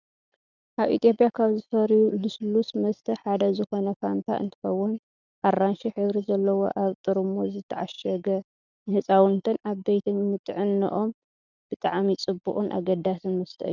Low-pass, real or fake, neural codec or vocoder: 7.2 kHz; real; none